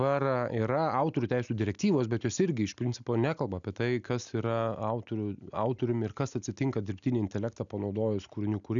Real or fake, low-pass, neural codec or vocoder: real; 7.2 kHz; none